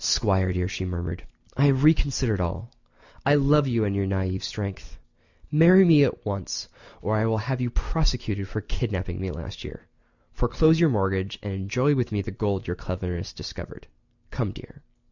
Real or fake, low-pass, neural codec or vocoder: real; 7.2 kHz; none